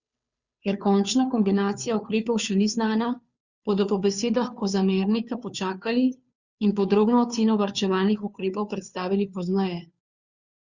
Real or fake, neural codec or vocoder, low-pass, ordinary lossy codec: fake; codec, 16 kHz, 2 kbps, FunCodec, trained on Chinese and English, 25 frames a second; 7.2 kHz; Opus, 64 kbps